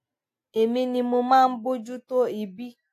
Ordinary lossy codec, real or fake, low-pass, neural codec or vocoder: AAC, 64 kbps; real; 14.4 kHz; none